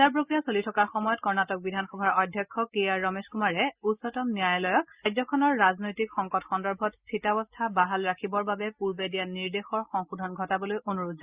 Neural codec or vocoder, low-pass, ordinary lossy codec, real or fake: none; 3.6 kHz; Opus, 64 kbps; real